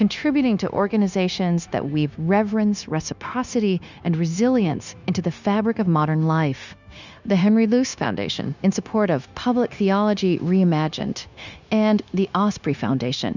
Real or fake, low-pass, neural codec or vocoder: fake; 7.2 kHz; codec, 16 kHz, 0.9 kbps, LongCat-Audio-Codec